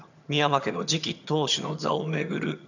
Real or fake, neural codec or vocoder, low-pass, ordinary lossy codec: fake; vocoder, 22.05 kHz, 80 mel bands, HiFi-GAN; 7.2 kHz; none